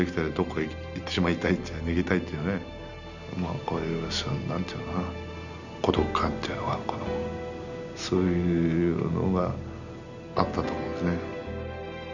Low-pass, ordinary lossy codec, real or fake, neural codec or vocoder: 7.2 kHz; none; real; none